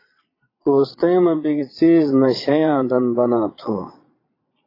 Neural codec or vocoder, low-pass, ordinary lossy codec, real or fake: vocoder, 22.05 kHz, 80 mel bands, Vocos; 5.4 kHz; AAC, 24 kbps; fake